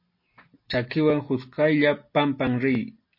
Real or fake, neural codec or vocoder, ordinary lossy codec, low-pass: real; none; MP3, 32 kbps; 5.4 kHz